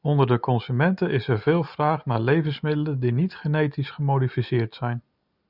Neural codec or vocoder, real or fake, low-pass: none; real; 5.4 kHz